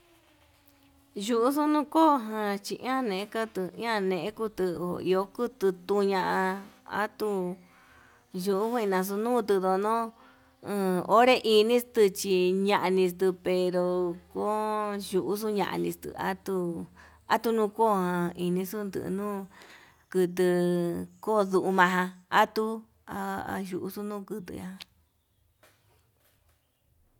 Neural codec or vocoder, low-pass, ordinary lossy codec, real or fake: none; 19.8 kHz; none; real